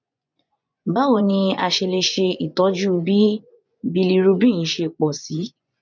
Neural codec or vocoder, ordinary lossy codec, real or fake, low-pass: vocoder, 24 kHz, 100 mel bands, Vocos; none; fake; 7.2 kHz